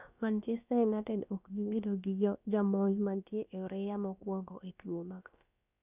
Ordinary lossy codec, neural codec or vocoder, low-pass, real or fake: none; codec, 16 kHz, about 1 kbps, DyCAST, with the encoder's durations; 3.6 kHz; fake